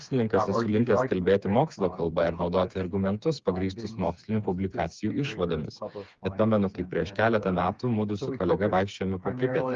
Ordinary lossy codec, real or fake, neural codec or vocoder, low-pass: Opus, 32 kbps; fake; codec, 16 kHz, 4 kbps, FreqCodec, smaller model; 7.2 kHz